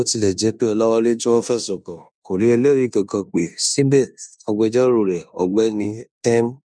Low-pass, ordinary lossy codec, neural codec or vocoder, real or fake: 9.9 kHz; MP3, 96 kbps; codec, 16 kHz in and 24 kHz out, 0.9 kbps, LongCat-Audio-Codec, four codebook decoder; fake